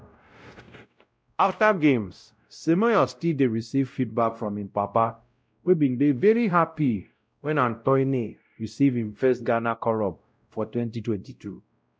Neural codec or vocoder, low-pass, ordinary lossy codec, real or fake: codec, 16 kHz, 0.5 kbps, X-Codec, WavLM features, trained on Multilingual LibriSpeech; none; none; fake